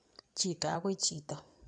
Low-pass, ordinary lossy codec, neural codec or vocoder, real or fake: 9.9 kHz; none; codec, 16 kHz in and 24 kHz out, 2.2 kbps, FireRedTTS-2 codec; fake